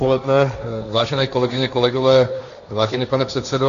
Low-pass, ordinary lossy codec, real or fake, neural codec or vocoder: 7.2 kHz; MP3, 96 kbps; fake; codec, 16 kHz, 1.1 kbps, Voila-Tokenizer